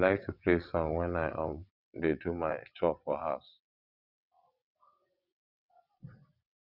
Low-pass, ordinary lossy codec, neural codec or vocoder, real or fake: 5.4 kHz; none; vocoder, 22.05 kHz, 80 mel bands, WaveNeXt; fake